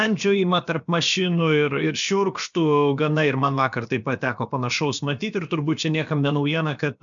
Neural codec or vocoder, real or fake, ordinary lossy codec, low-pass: codec, 16 kHz, about 1 kbps, DyCAST, with the encoder's durations; fake; MP3, 96 kbps; 7.2 kHz